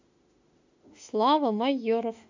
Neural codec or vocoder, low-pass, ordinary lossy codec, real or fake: autoencoder, 48 kHz, 32 numbers a frame, DAC-VAE, trained on Japanese speech; 7.2 kHz; AAC, 48 kbps; fake